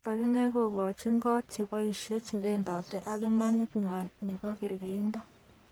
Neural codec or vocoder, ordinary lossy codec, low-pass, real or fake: codec, 44.1 kHz, 1.7 kbps, Pupu-Codec; none; none; fake